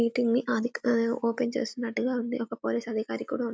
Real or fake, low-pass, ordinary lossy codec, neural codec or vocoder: real; none; none; none